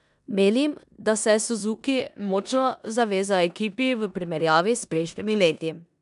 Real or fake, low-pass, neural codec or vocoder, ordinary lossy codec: fake; 10.8 kHz; codec, 16 kHz in and 24 kHz out, 0.9 kbps, LongCat-Audio-Codec, four codebook decoder; none